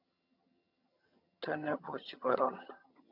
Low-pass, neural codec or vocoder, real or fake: 5.4 kHz; vocoder, 22.05 kHz, 80 mel bands, HiFi-GAN; fake